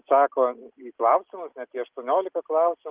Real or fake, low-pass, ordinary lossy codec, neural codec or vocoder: real; 3.6 kHz; Opus, 32 kbps; none